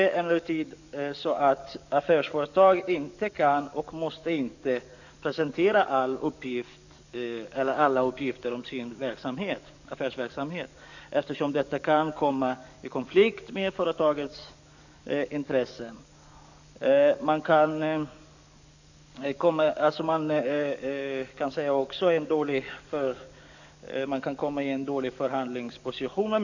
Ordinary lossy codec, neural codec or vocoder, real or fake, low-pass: none; codec, 44.1 kHz, 7.8 kbps, DAC; fake; 7.2 kHz